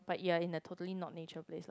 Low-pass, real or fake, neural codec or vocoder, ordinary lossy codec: none; real; none; none